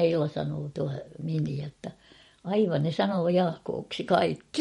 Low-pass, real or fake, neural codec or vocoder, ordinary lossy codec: 19.8 kHz; fake; vocoder, 44.1 kHz, 128 mel bands every 512 samples, BigVGAN v2; MP3, 48 kbps